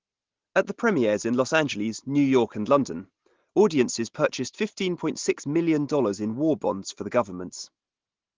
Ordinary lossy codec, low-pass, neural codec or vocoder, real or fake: Opus, 16 kbps; 7.2 kHz; none; real